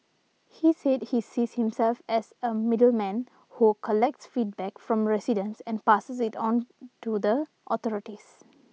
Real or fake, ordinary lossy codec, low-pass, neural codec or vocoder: real; none; none; none